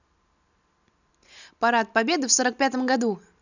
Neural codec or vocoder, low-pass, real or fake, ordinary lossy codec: none; 7.2 kHz; real; none